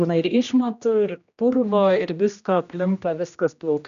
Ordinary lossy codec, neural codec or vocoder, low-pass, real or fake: AAC, 96 kbps; codec, 16 kHz, 1 kbps, X-Codec, HuBERT features, trained on general audio; 7.2 kHz; fake